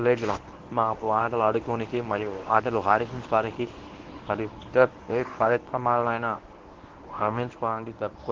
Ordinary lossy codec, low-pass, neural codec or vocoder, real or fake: Opus, 16 kbps; 7.2 kHz; codec, 24 kHz, 0.9 kbps, WavTokenizer, medium speech release version 1; fake